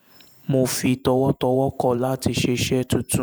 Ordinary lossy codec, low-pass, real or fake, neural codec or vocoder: none; none; fake; vocoder, 48 kHz, 128 mel bands, Vocos